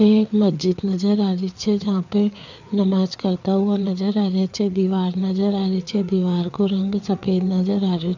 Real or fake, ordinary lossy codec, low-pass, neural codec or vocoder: fake; none; 7.2 kHz; codec, 16 kHz, 4 kbps, FreqCodec, larger model